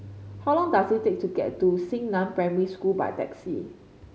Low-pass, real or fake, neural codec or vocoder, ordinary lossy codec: none; real; none; none